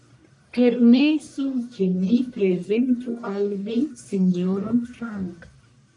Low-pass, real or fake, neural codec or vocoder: 10.8 kHz; fake; codec, 44.1 kHz, 1.7 kbps, Pupu-Codec